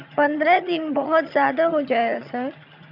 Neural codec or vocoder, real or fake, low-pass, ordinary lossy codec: vocoder, 22.05 kHz, 80 mel bands, HiFi-GAN; fake; 5.4 kHz; none